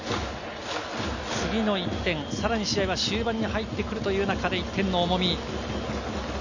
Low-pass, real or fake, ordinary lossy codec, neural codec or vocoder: 7.2 kHz; real; none; none